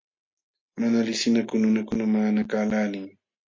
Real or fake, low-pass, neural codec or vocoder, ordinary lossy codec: real; 7.2 kHz; none; MP3, 48 kbps